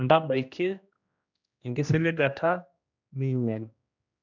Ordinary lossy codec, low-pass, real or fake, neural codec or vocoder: none; 7.2 kHz; fake; codec, 16 kHz, 1 kbps, X-Codec, HuBERT features, trained on general audio